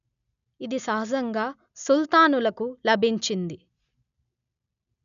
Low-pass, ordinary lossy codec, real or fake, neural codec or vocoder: 7.2 kHz; none; real; none